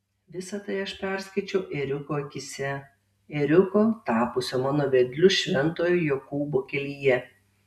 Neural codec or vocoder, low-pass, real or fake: none; 14.4 kHz; real